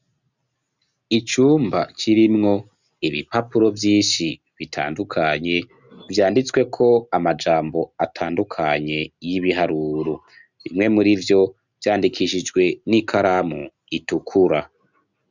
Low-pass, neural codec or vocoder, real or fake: 7.2 kHz; none; real